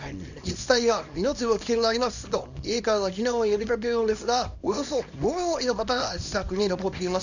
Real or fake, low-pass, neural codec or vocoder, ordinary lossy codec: fake; 7.2 kHz; codec, 24 kHz, 0.9 kbps, WavTokenizer, small release; none